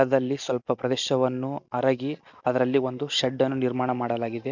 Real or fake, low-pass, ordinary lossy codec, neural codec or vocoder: real; 7.2 kHz; none; none